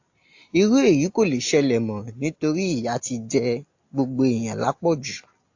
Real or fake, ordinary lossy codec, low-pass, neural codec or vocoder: real; AAC, 48 kbps; 7.2 kHz; none